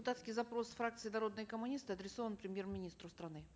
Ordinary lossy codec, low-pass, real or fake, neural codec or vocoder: none; none; real; none